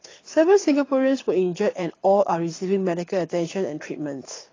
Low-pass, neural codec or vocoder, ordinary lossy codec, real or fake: 7.2 kHz; codec, 24 kHz, 6 kbps, HILCodec; AAC, 32 kbps; fake